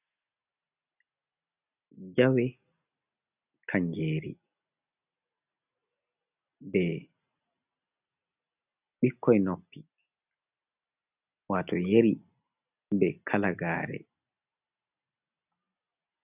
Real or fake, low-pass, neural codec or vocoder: real; 3.6 kHz; none